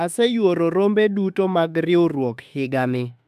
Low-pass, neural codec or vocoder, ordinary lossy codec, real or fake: 14.4 kHz; autoencoder, 48 kHz, 32 numbers a frame, DAC-VAE, trained on Japanese speech; AAC, 96 kbps; fake